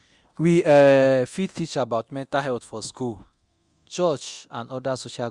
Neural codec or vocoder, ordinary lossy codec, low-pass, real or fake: codec, 24 kHz, 0.9 kbps, DualCodec; Opus, 64 kbps; 10.8 kHz; fake